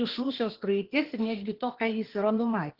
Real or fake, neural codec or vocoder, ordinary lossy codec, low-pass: fake; codec, 16 kHz, 0.8 kbps, ZipCodec; Opus, 16 kbps; 5.4 kHz